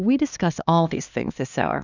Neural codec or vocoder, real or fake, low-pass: codec, 16 kHz, 2 kbps, X-Codec, HuBERT features, trained on LibriSpeech; fake; 7.2 kHz